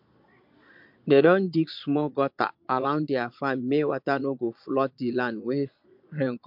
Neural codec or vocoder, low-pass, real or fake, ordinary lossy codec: vocoder, 22.05 kHz, 80 mel bands, Vocos; 5.4 kHz; fake; MP3, 48 kbps